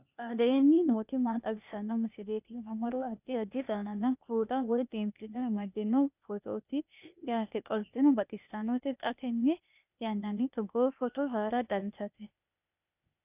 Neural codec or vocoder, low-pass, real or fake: codec, 16 kHz, 0.8 kbps, ZipCodec; 3.6 kHz; fake